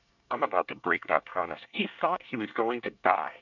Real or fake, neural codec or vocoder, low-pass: fake; codec, 24 kHz, 1 kbps, SNAC; 7.2 kHz